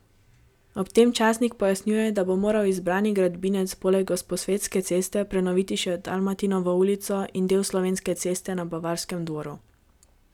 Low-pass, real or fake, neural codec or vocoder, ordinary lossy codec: 19.8 kHz; real; none; none